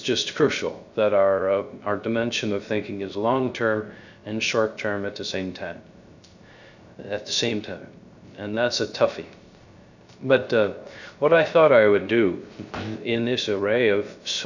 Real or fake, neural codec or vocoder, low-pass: fake; codec, 16 kHz, 0.3 kbps, FocalCodec; 7.2 kHz